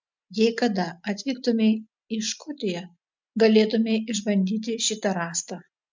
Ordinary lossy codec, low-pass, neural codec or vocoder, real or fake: MP3, 64 kbps; 7.2 kHz; none; real